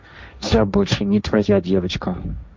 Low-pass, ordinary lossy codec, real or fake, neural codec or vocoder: none; none; fake; codec, 16 kHz, 1.1 kbps, Voila-Tokenizer